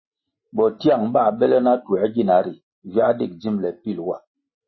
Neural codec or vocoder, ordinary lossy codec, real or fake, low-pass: none; MP3, 24 kbps; real; 7.2 kHz